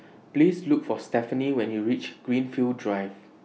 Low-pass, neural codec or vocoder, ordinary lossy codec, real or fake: none; none; none; real